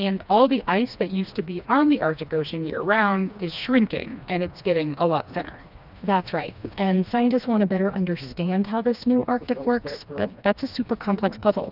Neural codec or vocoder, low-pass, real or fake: codec, 16 kHz, 2 kbps, FreqCodec, smaller model; 5.4 kHz; fake